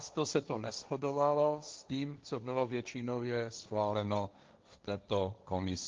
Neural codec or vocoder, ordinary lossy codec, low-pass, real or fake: codec, 16 kHz, 1.1 kbps, Voila-Tokenizer; Opus, 32 kbps; 7.2 kHz; fake